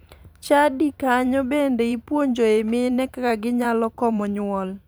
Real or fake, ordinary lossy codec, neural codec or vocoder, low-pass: fake; none; vocoder, 44.1 kHz, 128 mel bands every 512 samples, BigVGAN v2; none